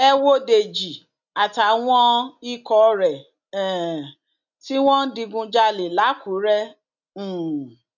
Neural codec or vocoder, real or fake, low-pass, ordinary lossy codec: none; real; 7.2 kHz; none